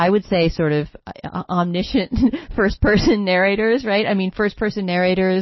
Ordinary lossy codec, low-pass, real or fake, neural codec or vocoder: MP3, 24 kbps; 7.2 kHz; real; none